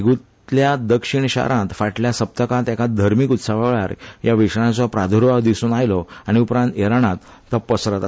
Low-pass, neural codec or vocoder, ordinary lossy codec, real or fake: none; none; none; real